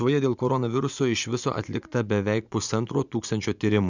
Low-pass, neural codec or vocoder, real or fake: 7.2 kHz; vocoder, 24 kHz, 100 mel bands, Vocos; fake